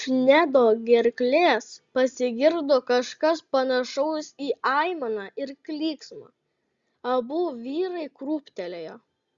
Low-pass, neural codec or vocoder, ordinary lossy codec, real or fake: 7.2 kHz; none; Opus, 64 kbps; real